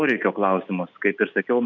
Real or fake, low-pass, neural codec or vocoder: real; 7.2 kHz; none